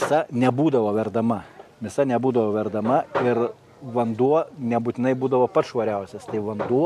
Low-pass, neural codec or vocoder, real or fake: 14.4 kHz; none; real